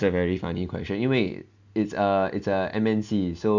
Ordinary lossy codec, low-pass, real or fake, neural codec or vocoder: MP3, 64 kbps; 7.2 kHz; real; none